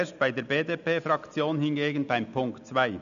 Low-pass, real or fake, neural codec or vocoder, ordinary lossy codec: 7.2 kHz; real; none; none